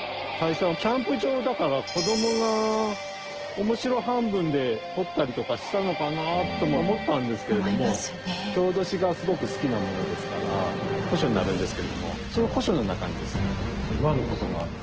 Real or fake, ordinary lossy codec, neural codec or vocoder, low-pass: real; Opus, 16 kbps; none; 7.2 kHz